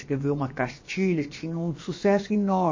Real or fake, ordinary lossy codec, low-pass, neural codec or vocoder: fake; MP3, 32 kbps; 7.2 kHz; codec, 16 kHz, about 1 kbps, DyCAST, with the encoder's durations